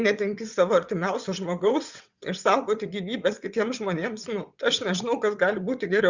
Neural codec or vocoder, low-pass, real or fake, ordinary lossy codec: vocoder, 22.05 kHz, 80 mel bands, WaveNeXt; 7.2 kHz; fake; Opus, 64 kbps